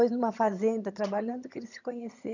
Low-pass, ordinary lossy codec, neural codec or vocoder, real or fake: 7.2 kHz; none; vocoder, 22.05 kHz, 80 mel bands, HiFi-GAN; fake